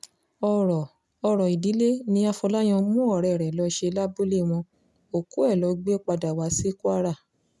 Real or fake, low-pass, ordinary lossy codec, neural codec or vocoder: real; none; none; none